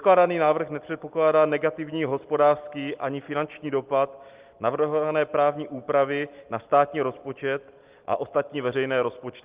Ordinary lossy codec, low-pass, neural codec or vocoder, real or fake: Opus, 32 kbps; 3.6 kHz; none; real